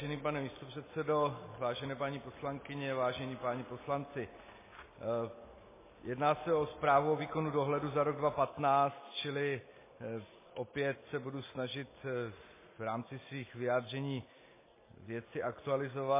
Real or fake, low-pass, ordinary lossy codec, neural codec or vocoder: real; 3.6 kHz; MP3, 16 kbps; none